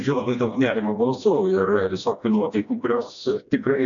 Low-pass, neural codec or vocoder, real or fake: 7.2 kHz; codec, 16 kHz, 1 kbps, FreqCodec, smaller model; fake